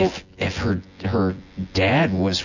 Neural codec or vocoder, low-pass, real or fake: vocoder, 24 kHz, 100 mel bands, Vocos; 7.2 kHz; fake